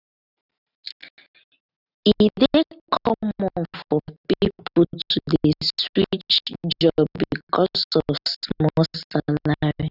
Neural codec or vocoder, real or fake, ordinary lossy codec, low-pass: none; real; none; 5.4 kHz